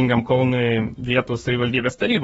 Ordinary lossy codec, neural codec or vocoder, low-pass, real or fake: AAC, 24 kbps; codec, 32 kHz, 1.9 kbps, SNAC; 14.4 kHz; fake